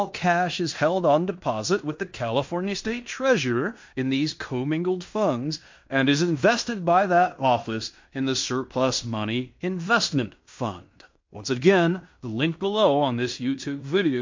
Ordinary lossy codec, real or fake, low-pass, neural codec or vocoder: MP3, 48 kbps; fake; 7.2 kHz; codec, 16 kHz in and 24 kHz out, 0.9 kbps, LongCat-Audio-Codec, fine tuned four codebook decoder